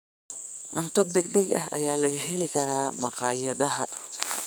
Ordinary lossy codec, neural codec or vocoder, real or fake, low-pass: none; codec, 44.1 kHz, 2.6 kbps, SNAC; fake; none